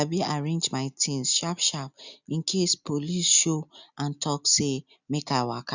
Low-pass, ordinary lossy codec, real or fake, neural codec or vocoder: 7.2 kHz; none; real; none